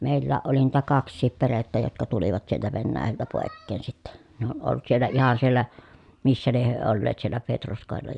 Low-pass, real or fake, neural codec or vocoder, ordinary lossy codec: 10.8 kHz; real; none; none